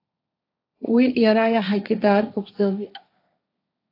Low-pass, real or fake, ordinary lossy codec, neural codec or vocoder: 5.4 kHz; fake; AAC, 32 kbps; codec, 16 kHz, 1.1 kbps, Voila-Tokenizer